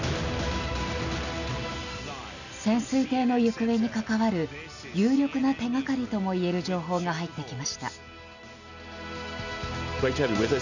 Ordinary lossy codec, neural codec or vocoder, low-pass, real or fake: none; none; 7.2 kHz; real